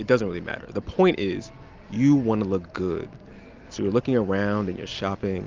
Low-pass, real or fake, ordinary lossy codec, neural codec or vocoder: 7.2 kHz; real; Opus, 24 kbps; none